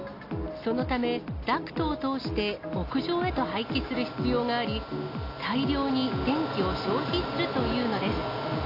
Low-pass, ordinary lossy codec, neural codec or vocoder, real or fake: 5.4 kHz; AAC, 32 kbps; none; real